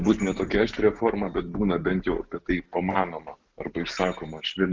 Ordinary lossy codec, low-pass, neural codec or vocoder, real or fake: Opus, 32 kbps; 7.2 kHz; none; real